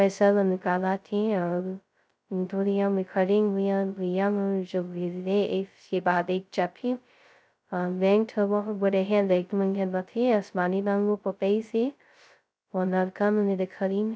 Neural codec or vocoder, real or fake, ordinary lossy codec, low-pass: codec, 16 kHz, 0.2 kbps, FocalCodec; fake; none; none